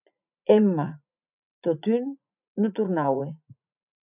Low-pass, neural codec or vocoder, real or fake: 3.6 kHz; vocoder, 44.1 kHz, 80 mel bands, Vocos; fake